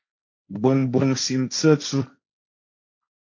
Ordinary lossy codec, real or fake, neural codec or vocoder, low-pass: AAC, 48 kbps; fake; codec, 16 kHz, 1.1 kbps, Voila-Tokenizer; 7.2 kHz